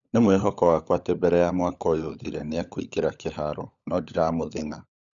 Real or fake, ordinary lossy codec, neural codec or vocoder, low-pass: fake; none; codec, 16 kHz, 16 kbps, FunCodec, trained on LibriTTS, 50 frames a second; 7.2 kHz